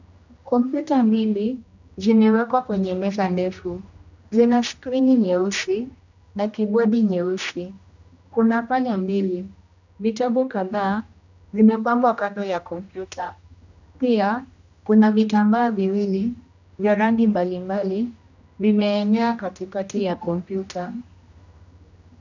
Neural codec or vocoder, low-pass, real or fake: codec, 16 kHz, 1 kbps, X-Codec, HuBERT features, trained on general audio; 7.2 kHz; fake